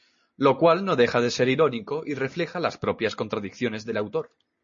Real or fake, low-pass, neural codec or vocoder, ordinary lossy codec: real; 7.2 kHz; none; MP3, 32 kbps